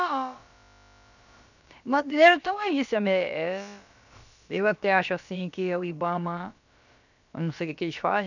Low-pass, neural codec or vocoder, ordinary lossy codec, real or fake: 7.2 kHz; codec, 16 kHz, about 1 kbps, DyCAST, with the encoder's durations; none; fake